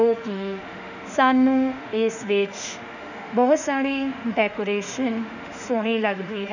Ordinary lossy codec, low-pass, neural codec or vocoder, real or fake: none; 7.2 kHz; autoencoder, 48 kHz, 32 numbers a frame, DAC-VAE, trained on Japanese speech; fake